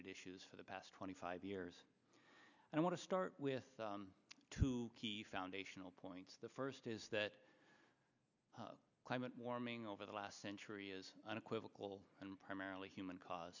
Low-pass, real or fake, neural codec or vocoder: 7.2 kHz; real; none